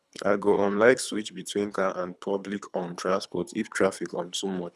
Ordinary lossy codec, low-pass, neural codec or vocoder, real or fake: none; none; codec, 24 kHz, 3 kbps, HILCodec; fake